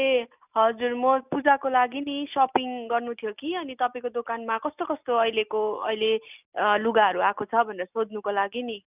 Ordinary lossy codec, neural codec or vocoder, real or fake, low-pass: none; none; real; 3.6 kHz